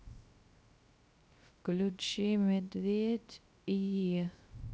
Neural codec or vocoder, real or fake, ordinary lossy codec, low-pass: codec, 16 kHz, 0.3 kbps, FocalCodec; fake; none; none